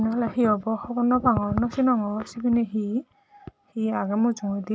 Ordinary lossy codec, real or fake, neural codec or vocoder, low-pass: none; real; none; none